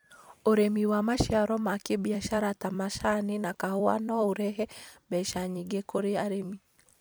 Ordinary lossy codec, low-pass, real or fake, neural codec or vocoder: none; none; fake; vocoder, 44.1 kHz, 128 mel bands every 256 samples, BigVGAN v2